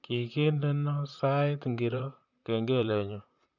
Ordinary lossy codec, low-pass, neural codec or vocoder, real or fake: none; 7.2 kHz; vocoder, 44.1 kHz, 128 mel bands, Pupu-Vocoder; fake